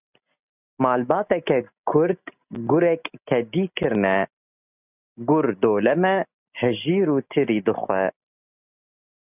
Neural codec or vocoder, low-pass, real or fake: none; 3.6 kHz; real